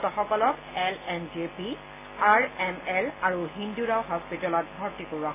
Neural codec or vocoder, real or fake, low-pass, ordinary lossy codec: none; real; 3.6 kHz; AAC, 16 kbps